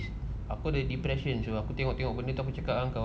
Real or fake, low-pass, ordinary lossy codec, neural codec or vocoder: real; none; none; none